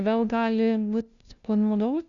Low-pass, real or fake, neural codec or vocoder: 7.2 kHz; fake; codec, 16 kHz, 0.5 kbps, FunCodec, trained on LibriTTS, 25 frames a second